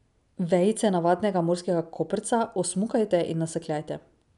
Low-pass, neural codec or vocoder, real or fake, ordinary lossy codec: 10.8 kHz; none; real; none